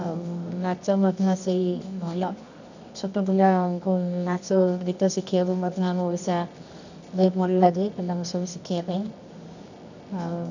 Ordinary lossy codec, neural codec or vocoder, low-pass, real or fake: none; codec, 24 kHz, 0.9 kbps, WavTokenizer, medium music audio release; 7.2 kHz; fake